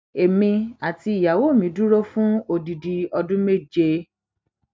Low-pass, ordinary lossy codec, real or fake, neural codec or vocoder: none; none; real; none